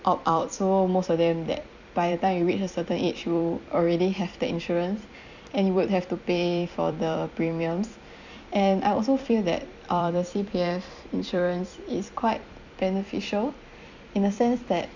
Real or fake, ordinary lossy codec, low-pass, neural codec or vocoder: fake; none; 7.2 kHz; vocoder, 44.1 kHz, 128 mel bands every 256 samples, BigVGAN v2